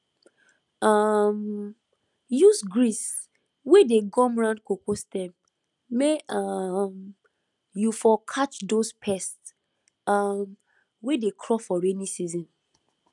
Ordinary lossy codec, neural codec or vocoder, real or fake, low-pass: none; none; real; 10.8 kHz